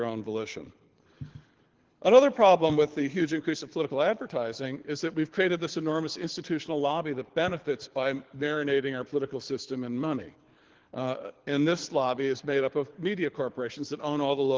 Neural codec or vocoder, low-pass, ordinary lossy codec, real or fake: codec, 24 kHz, 6 kbps, HILCodec; 7.2 kHz; Opus, 16 kbps; fake